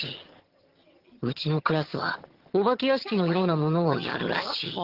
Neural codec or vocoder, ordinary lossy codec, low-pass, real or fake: vocoder, 22.05 kHz, 80 mel bands, HiFi-GAN; Opus, 16 kbps; 5.4 kHz; fake